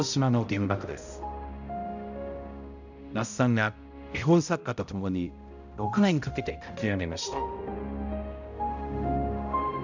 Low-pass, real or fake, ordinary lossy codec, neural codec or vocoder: 7.2 kHz; fake; none; codec, 16 kHz, 0.5 kbps, X-Codec, HuBERT features, trained on balanced general audio